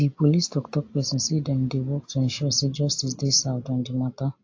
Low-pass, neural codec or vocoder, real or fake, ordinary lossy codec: 7.2 kHz; none; real; AAC, 48 kbps